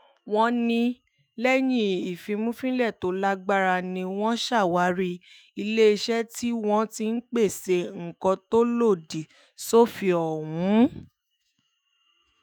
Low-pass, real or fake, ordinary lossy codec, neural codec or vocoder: none; fake; none; autoencoder, 48 kHz, 128 numbers a frame, DAC-VAE, trained on Japanese speech